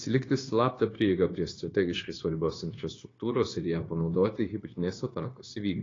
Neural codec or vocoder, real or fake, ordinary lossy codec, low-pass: codec, 16 kHz, 0.9 kbps, LongCat-Audio-Codec; fake; AAC, 32 kbps; 7.2 kHz